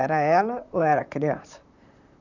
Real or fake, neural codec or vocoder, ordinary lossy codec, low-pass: fake; vocoder, 44.1 kHz, 128 mel bands, Pupu-Vocoder; none; 7.2 kHz